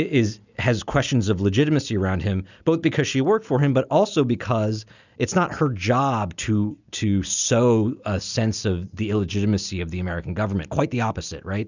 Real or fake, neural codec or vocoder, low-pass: real; none; 7.2 kHz